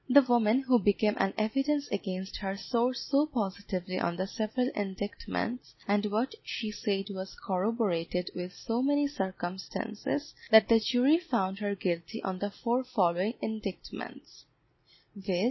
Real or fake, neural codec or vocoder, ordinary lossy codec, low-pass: real; none; MP3, 24 kbps; 7.2 kHz